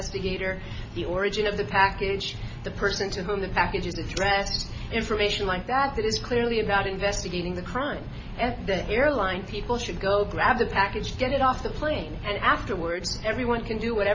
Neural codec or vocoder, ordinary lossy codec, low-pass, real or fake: none; MP3, 32 kbps; 7.2 kHz; real